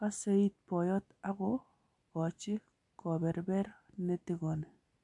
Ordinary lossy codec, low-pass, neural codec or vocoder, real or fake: MP3, 64 kbps; 10.8 kHz; none; real